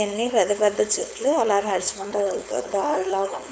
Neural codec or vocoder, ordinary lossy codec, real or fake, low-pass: codec, 16 kHz, 4.8 kbps, FACodec; none; fake; none